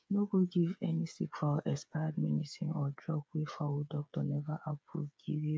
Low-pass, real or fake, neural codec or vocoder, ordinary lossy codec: none; fake; codec, 16 kHz, 8 kbps, FreqCodec, smaller model; none